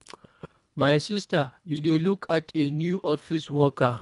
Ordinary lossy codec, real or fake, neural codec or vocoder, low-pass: none; fake; codec, 24 kHz, 1.5 kbps, HILCodec; 10.8 kHz